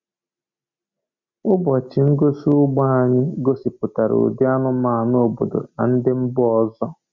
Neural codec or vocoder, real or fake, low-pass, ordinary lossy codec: none; real; 7.2 kHz; none